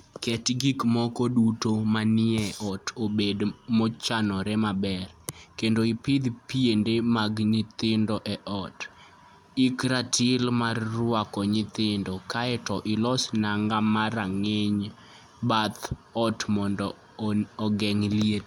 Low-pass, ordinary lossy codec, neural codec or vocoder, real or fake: 19.8 kHz; none; none; real